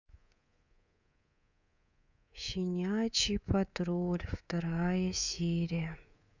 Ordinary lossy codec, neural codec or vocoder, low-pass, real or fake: none; codec, 24 kHz, 3.1 kbps, DualCodec; 7.2 kHz; fake